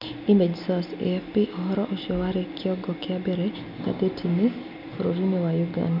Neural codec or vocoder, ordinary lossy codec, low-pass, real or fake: none; none; 5.4 kHz; real